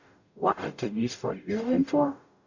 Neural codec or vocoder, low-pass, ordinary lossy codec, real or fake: codec, 44.1 kHz, 0.9 kbps, DAC; 7.2 kHz; none; fake